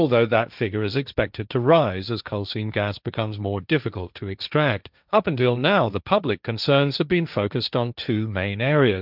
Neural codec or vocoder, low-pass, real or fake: codec, 16 kHz, 1.1 kbps, Voila-Tokenizer; 5.4 kHz; fake